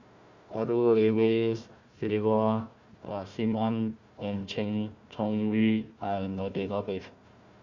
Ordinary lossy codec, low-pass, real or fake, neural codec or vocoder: Opus, 64 kbps; 7.2 kHz; fake; codec, 16 kHz, 1 kbps, FunCodec, trained on Chinese and English, 50 frames a second